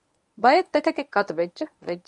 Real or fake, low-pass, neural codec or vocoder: fake; 10.8 kHz; codec, 24 kHz, 0.9 kbps, WavTokenizer, medium speech release version 1